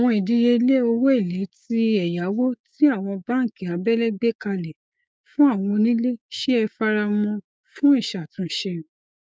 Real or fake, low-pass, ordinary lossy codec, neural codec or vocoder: fake; none; none; codec, 16 kHz, 6 kbps, DAC